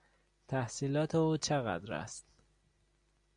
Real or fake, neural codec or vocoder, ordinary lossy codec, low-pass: real; none; Opus, 64 kbps; 9.9 kHz